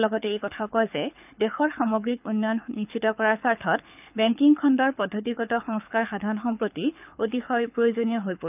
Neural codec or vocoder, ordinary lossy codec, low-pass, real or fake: codec, 24 kHz, 6 kbps, HILCodec; none; 3.6 kHz; fake